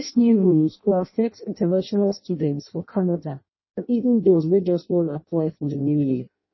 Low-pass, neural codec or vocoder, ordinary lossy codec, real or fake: 7.2 kHz; codec, 16 kHz in and 24 kHz out, 0.6 kbps, FireRedTTS-2 codec; MP3, 24 kbps; fake